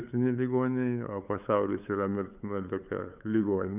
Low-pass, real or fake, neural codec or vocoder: 3.6 kHz; fake; codec, 16 kHz, 8 kbps, FunCodec, trained on LibriTTS, 25 frames a second